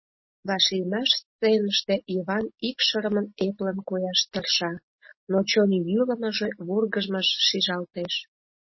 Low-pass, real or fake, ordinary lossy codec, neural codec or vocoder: 7.2 kHz; real; MP3, 24 kbps; none